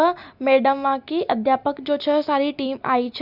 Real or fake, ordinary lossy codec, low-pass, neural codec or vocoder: real; none; 5.4 kHz; none